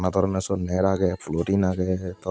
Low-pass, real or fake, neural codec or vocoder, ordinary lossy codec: none; real; none; none